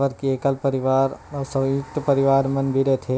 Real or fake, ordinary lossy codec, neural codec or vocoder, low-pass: real; none; none; none